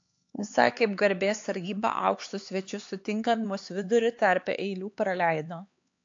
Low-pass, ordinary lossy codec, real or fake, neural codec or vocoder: 7.2 kHz; AAC, 48 kbps; fake; codec, 16 kHz, 4 kbps, X-Codec, HuBERT features, trained on LibriSpeech